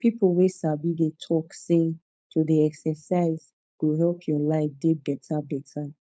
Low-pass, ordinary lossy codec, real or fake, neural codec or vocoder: none; none; fake; codec, 16 kHz, 4.8 kbps, FACodec